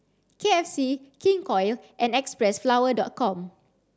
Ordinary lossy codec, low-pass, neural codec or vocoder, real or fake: none; none; none; real